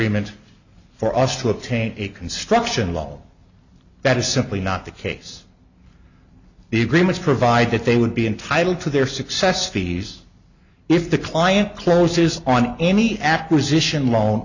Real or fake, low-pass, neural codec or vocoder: real; 7.2 kHz; none